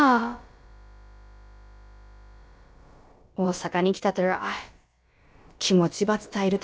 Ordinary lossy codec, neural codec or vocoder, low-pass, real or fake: none; codec, 16 kHz, about 1 kbps, DyCAST, with the encoder's durations; none; fake